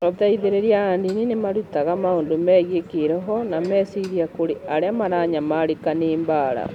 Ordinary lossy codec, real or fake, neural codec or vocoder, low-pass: none; real; none; 19.8 kHz